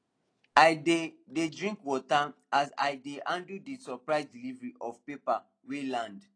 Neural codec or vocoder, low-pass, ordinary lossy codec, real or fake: none; 9.9 kHz; AAC, 32 kbps; real